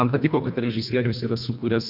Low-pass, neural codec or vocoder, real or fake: 5.4 kHz; codec, 24 kHz, 1.5 kbps, HILCodec; fake